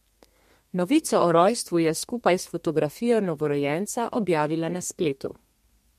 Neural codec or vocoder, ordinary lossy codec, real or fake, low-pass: codec, 32 kHz, 1.9 kbps, SNAC; MP3, 64 kbps; fake; 14.4 kHz